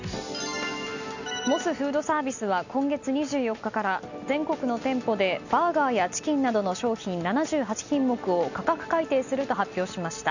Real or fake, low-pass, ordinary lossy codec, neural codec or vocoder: real; 7.2 kHz; none; none